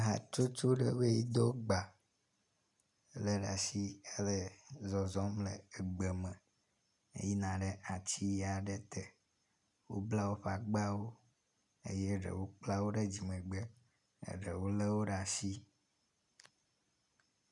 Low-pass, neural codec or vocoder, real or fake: 10.8 kHz; none; real